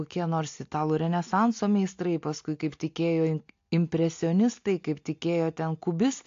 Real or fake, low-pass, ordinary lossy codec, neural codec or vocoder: real; 7.2 kHz; AAC, 48 kbps; none